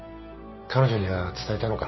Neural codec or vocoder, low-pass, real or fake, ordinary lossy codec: none; 7.2 kHz; real; MP3, 24 kbps